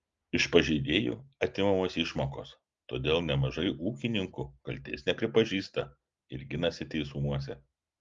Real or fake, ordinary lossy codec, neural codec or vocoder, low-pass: real; Opus, 32 kbps; none; 7.2 kHz